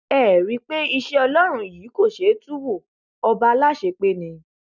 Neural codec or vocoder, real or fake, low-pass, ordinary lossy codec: none; real; 7.2 kHz; none